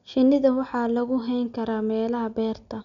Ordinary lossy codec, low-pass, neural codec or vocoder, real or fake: none; 7.2 kHz; none; real